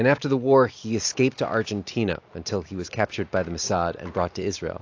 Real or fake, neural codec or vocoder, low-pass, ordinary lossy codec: real; none; 7.2 kHz; AAC, 48 kbps